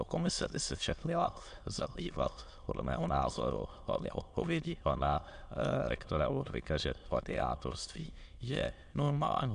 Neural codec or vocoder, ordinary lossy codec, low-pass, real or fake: autoencoder, 22.05 kHz, a latent of 192 numbers a frame, VITS, trained on many speakers; MP3, 64 kbps; 9.9 kHz; fake